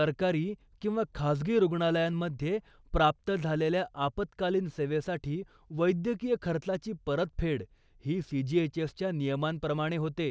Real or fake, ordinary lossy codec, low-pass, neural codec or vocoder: real; none; none; none